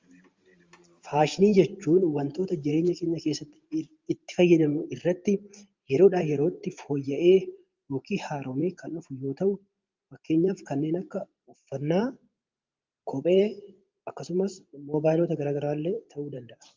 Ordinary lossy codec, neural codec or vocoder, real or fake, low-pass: Opus, 32 kbps; vocoder, 44.1 kHz, 128 mel bands every 512 samples, BigVGAN v2; fake; 7.2 kHz